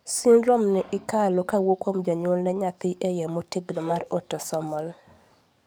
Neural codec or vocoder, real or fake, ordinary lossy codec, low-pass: codec, 44.1 kHz, 7.8 kbps, DAC; fake; none; none